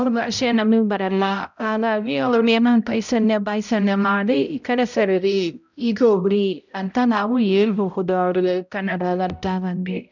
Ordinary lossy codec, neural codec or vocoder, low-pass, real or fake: none; codec, 16 kHz, 0.5 kbps, X-Codec, HuBERT features, trained on balanced general audio; 7.2 kHz; fake